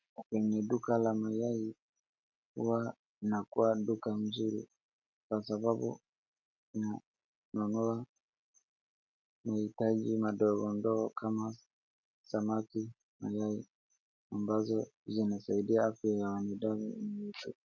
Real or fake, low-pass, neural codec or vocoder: real; 7.2 kHz; none